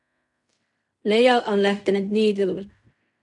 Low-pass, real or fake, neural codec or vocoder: 10.8 kHz; fake; codec, 16 kHz in and 24 kHz out, 0.4 kbps, LongCat-Audio-Codec, fine tuned four codebook decoder